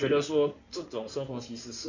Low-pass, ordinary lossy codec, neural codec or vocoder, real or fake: 7.2 kHz; none; codec, 16 kHz in and 24 kHz out, 2.2 kbps, FireRedTTS-2 codec; fake